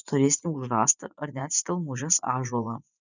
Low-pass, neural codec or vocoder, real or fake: 7.2 kHz; vocoder, 24 kHz, 100 mel bands, Vocos; fake